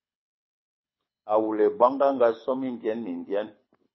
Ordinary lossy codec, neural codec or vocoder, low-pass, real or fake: MP3, 24 kbps; codec, 24 kHz, 6 kbps, HILCodec; 7.2 kHz; fake